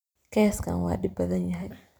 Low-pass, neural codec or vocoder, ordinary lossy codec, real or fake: none; vocoder, 44.1 kHz, 128 mel bands every 256 samples, BigVGAN v2; none; fake